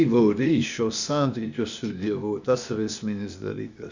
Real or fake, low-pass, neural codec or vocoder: fake; 7.2 kHz; codec, 16 kHz, 0.8 kbps, ZipCodec